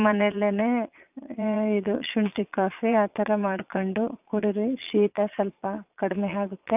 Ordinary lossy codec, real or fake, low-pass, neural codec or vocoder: none; fake; 3.6 kHz; vocoder, 22.05 kHz, 80 mel bands, Vocos